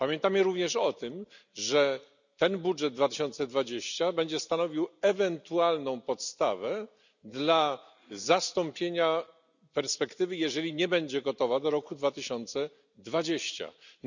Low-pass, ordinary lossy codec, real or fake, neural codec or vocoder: 7.2 kHz; none; real; none